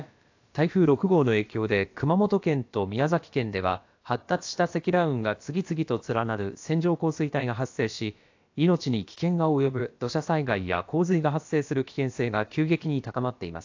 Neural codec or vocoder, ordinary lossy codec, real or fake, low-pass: codec, 16 kHz, about 1 kbps, DyCAST, with the encoder's durations; AAC, 48 kbps; fake; 7.2 kHz